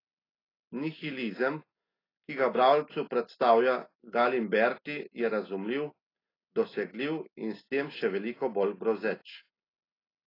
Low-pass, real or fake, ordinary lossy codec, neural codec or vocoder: 5.4 kHz; real; AAC, 24 kbps; none